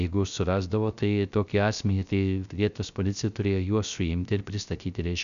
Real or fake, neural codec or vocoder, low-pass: fake; codec, 16 kHz, 0.3 kbps, FocalCodec; 7.2 kHz